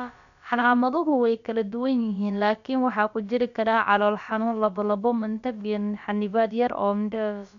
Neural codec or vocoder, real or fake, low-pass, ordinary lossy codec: codec, 16 kHz, about 1 kbps, DyCAST, with the encoder's durations; fake; 7.2 kHz; none